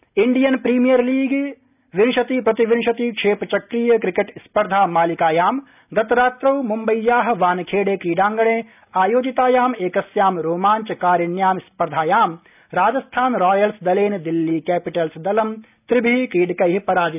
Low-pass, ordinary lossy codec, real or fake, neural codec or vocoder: 3.6 kHz; none; real; none